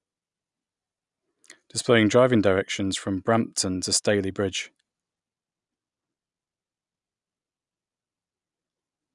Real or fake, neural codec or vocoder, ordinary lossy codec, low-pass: real; none; none; 10.8 kHz